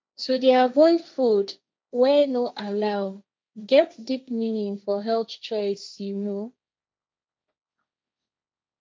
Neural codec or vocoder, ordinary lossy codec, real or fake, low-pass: codec, 16 kHz, 1.1 kbps, Voila-Tokenizer; none; fake; none